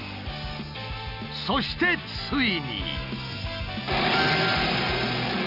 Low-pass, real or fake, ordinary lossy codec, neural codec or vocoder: 5.4 kHz; real; Opus, 64 kbps; none